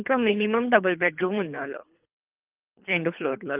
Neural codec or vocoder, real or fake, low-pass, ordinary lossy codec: codec, 24 kHz, 3 kbps, HILCodec; fake; 3.6 kHz; Opus, 24 kbps